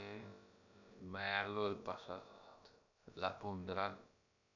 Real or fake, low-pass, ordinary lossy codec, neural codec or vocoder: fake; 7.2 kHz; none; codec, 16 kHz, about 1 kbps, DyCAST, with the encoder's durations